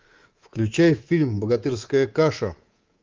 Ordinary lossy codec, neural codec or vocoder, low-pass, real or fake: Opus, 32 kbps; codec, 24 kHz, 3.1 kbps, DualCodec; 7.2 kHz; fake